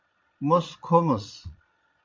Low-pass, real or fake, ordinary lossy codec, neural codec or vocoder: 7.2 kHz; fake; MP3, 48 kbps; vocoder, 22.05 kHz, 80 mel bands, Vocos